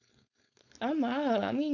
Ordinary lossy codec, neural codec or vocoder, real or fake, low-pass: none; codec, 16 kHz, 4.8 kbps, FACodec; fake; 7.2 kHz